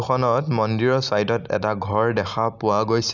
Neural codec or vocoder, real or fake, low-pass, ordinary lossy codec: none; real; 7.2 kHz; none